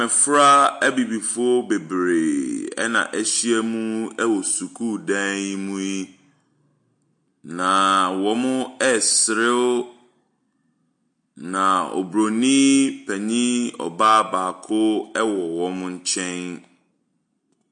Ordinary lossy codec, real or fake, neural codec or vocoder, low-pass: MP3, 48 kbps; real; none; 9.9 kHz